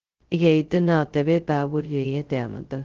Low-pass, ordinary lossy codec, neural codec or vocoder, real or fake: 7.2 kHz; Opus, 16 kbps; codec, 16 kHz, 0.2 kbps, FocalCodec; fake